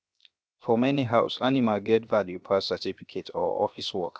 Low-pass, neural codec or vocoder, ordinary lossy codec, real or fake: none; codec, 16 kHz, 0.7 kbps, FocalCodec; none; fake